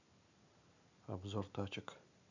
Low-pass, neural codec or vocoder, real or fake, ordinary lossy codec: 7.2 kHz; none; real; none